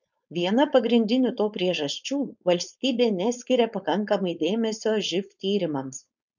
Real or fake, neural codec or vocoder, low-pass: fake; codec, 16 kHz, 4.8 kbps, FACodec; 7.2 kHz